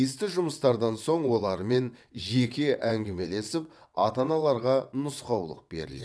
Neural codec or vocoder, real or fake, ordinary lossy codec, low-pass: vocoder, 22.05 kHz, 80 mel bands, WaveNeXt; fake; none; none